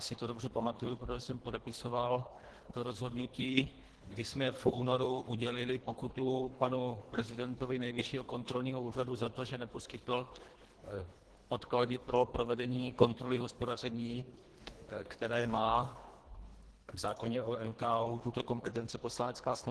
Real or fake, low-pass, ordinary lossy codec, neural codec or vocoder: fake; 10.8 kHz; Opus, 16 kbps; codec, 24 kHz, 1.5 kbps, HILCodec